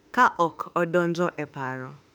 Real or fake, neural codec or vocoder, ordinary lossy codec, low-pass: fake; autoencoder, 48 kHz, 32 numbers a frame, DAC-VAE, trained on Japanese speech; none; 19.8 kHz